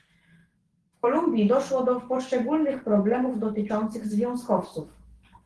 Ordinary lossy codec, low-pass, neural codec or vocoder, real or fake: Opus, 16 kbps; 10.8 kHz; none; real